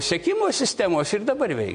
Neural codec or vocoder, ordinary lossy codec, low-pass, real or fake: none; MP3, 48 kbps; 9.9 kHz; real